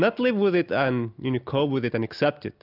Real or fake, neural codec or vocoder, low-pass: real; none; 5.4 kHz